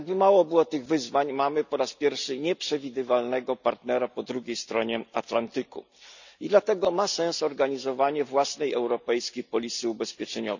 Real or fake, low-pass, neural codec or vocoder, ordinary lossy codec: real; 7.2 kHz; none; none